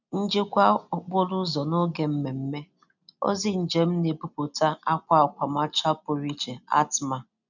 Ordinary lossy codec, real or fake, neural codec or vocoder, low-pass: none; real; none; 7.2 kHz